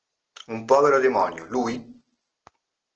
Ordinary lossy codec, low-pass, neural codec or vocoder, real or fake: Opus, 16 kbps; 7.2 kHz; none; real